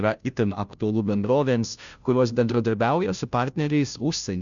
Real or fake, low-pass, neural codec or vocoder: fake; 7.2 kHz; codec, 16 kHz, 0.5 kbps, FunCodec, trained on Chinese and English, 25 frames a second